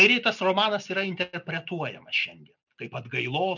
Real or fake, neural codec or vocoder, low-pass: real; none; 7.2 kHz